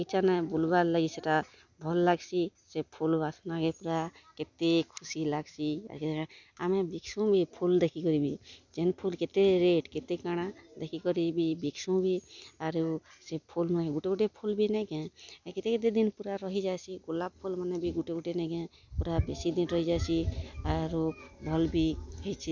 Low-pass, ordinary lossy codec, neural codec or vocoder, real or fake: 7.2 kHz; none; none; real